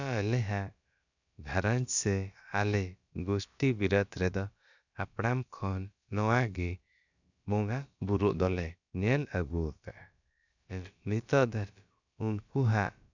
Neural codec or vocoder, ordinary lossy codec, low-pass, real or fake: codec, 16 kHz, about 1 kbps, DyCAST, with the encoder's durations; none; 7.2 kHz; fake